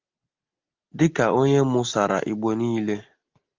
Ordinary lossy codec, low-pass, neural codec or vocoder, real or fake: Opus, 32 kbps; 7.2 kHz; none; real